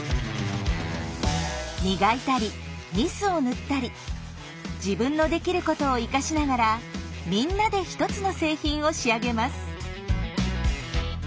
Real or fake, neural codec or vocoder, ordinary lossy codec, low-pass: real; none; none; none